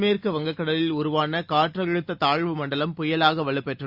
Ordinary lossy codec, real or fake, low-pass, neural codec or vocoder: Opus, 64 kbps; real; 5.4 kHz; none